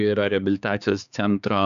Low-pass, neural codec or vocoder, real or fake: 7.2 kHz; codec, 16 kHz, 4 kbps, X-Codec, HuBERT features, trained on balanced general audio; fake